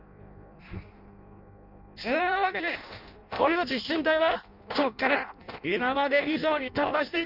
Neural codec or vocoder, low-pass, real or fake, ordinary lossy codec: codec, 16 kHz in and 24 kHz out, 0.6 kbps, FireRedTTS-2 codec; 5.4 kHz; fake; none